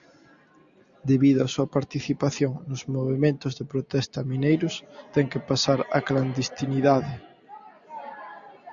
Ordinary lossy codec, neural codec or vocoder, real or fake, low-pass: Opus, 64 kbps; none; real; 7.2 kHz